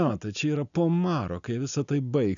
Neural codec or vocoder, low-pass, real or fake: none; 7.2 kHz; real